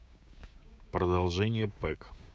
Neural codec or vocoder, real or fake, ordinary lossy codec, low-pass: codec, 16 kHz, 6 kbps, DAC; fake; none; none